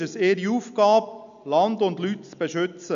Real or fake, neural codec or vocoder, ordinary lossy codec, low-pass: real; none; none; 7.2 kHz